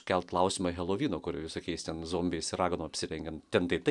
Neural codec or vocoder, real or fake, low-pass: none; real; 10.8 kHz